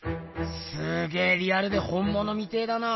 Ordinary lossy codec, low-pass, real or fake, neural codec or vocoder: MP3, 24 kbps; 7.2 kHz; real; none